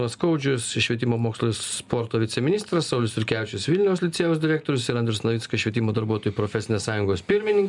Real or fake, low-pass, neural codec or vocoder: real; 10.8 kHz; none